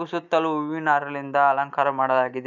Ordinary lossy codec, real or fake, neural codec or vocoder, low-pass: none; real; none; 7.2 kHz